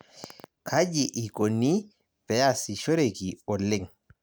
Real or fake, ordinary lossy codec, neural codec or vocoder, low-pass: real; none; none; none